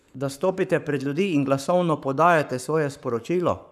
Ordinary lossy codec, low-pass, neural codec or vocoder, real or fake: none; 14.4 kHz; codec, 44.1 kHz, 7.8 kbps, Pupu-Codec; fake